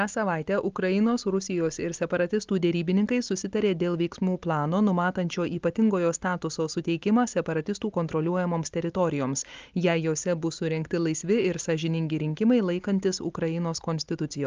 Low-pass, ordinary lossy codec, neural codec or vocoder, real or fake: 7.2 kHz; Opus, 24 kbps; none; real